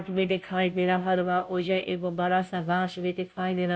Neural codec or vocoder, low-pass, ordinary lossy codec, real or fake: codec, 16 kHz, 0.5 kbps, FunCodec, trained on Chinese and English, 25 frames a second; none; none; fake